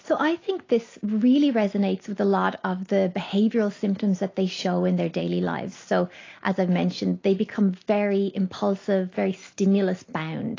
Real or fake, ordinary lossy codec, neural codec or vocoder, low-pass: real; AAC, 32 kbps; none; 7.2 kHz